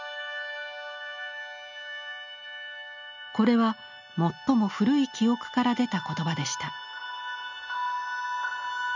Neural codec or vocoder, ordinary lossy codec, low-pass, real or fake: none; none; 7.2 kHz; real